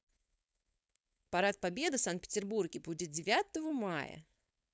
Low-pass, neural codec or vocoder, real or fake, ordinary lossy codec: none; codec, 16 kHz, 4.8 kbps, FACodec; fake; none